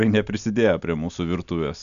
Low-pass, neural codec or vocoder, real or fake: 7.2 kHz; none; real